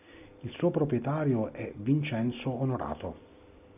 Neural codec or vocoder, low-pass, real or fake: none; 3.6 kHz; real